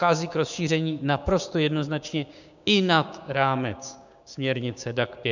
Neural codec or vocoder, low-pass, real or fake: codec, 16 kHz, 6 kbps, DAC; 7.2 kHz; fake